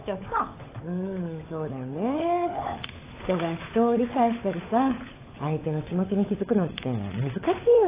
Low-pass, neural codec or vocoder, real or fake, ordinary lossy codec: 3.6 kHz; codec, 16 kHz, 16 kbps, FunCodec, trained on LibriTTS, 50 frames a second; fake; AAC, 16 kbps